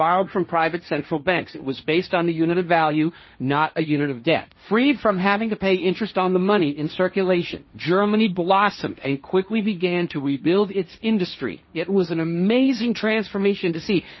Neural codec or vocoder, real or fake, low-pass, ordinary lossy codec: codec, 16 kHz, 1.1 kbps, Voila-Tokenizer; fake; 7.2 kHz; MP3, 24 kbps